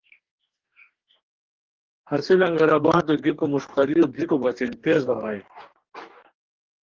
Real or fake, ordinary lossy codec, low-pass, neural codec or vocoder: fake; Opus, 16 kbps; 7.2 kHz; codec, 44.1 kHz, 2.6 kbps, DAC